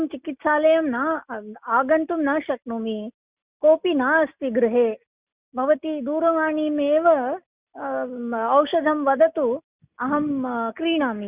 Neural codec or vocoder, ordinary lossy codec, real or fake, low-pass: none; Opus, 64 kbps; real; 3.6 kHz